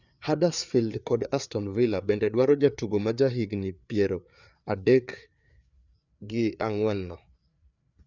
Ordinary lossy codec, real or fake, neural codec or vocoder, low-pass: none; fake; codec, 16 kHz, 4 kbps, FreqCodec, larger model; 7.2 kHz